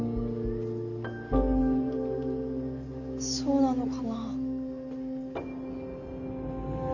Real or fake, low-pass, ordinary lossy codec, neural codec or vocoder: real; 7.2 kHz; none; none